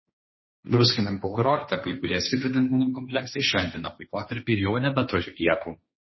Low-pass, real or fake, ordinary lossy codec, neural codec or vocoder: 7.2 kHz; fake; MP3, 24 kbps; codec, 16 kHz, 1.1 kbps, Voila-Tokenizer